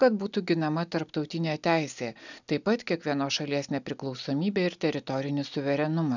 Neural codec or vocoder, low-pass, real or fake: none; 7.2 kHz; real